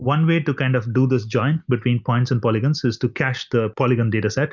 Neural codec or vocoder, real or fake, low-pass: none; real; 7.2 kHz